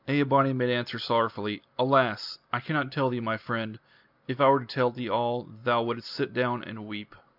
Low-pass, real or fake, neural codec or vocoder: 5.4 kHz; real; none